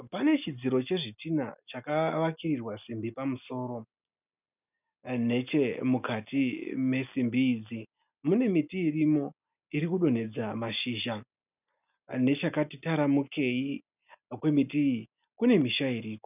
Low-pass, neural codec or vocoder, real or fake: 3.6 kHz; none; real